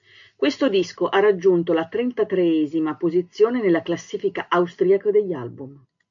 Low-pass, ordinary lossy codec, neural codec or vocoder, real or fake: 7.2 kHz; MP3, 64 kbps; none; real